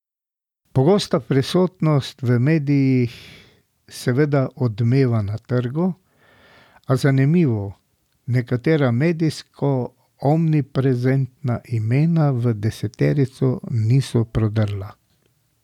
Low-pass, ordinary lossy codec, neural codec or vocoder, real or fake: 19.8 kHz; none; none; real